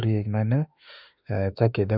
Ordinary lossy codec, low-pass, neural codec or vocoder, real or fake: none; 5.4 kHz; autoencoder, 48 kHz, 32 numbers a frame, DAC-VAE, trained on Japanese speech; fake